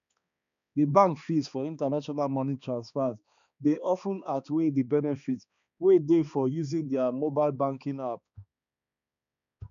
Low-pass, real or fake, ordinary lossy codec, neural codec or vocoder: 7.2 kHz; fake; none; codec, 16 kHz, 2 kbps, X-Codec, HuBERT features, trained on balanced general audio